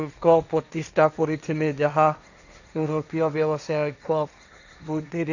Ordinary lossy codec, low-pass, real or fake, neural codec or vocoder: none; 7.2 kHz; fake; codec, 16 kHz, 1.1 kbps, Voila-Tokenizer